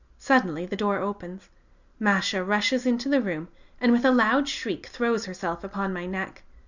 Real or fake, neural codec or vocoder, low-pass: real; none; 7.2 kHz